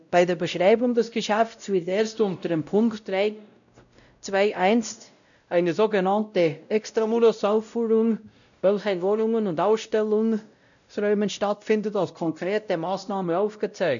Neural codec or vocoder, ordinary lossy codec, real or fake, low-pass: codec, 16 kHz, 0.5 kbps, X-Codec, WavLM features, trained on Multilingual LibriSpeech; none; fake; 7.2 kHz